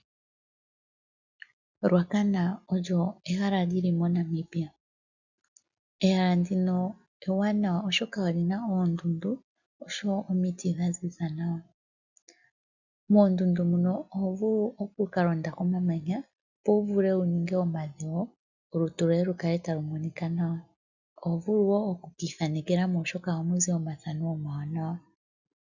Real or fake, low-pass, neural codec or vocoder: real; 7.2 kHz; none